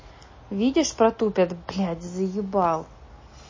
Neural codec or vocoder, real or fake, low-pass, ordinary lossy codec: none; real; 7.2 kHz; MP3, 32 kbps